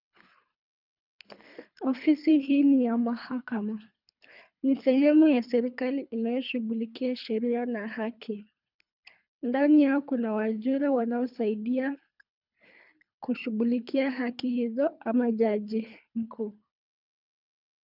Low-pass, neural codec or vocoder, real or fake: 5.4 kHz; codec, 24 kHz, 3 kbps, HILCodec; fake